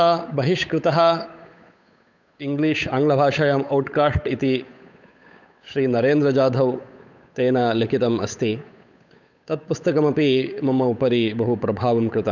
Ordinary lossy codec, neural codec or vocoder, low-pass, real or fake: none; codec, 16 kHz, 8 kbps, FunCodec, trained on Chinese and English, 25 frames a second; 7.2 kHz; fake